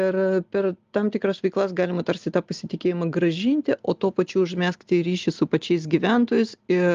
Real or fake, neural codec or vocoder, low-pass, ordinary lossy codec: real; none; 7.2 kHz; Opus, 32 kbps